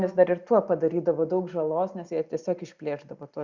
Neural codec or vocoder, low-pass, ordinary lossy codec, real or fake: none; 7.2 kHz; Opus, 64 kbps; real